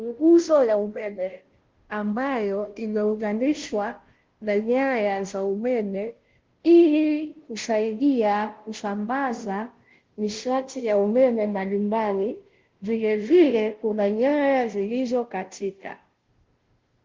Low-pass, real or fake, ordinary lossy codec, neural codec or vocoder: 7.2 kHz; fake; Opus, 16 kbps; codec, 16 kHz, 0.5 kbps, FunCodec, trained on Chinese and English, 25 frames a second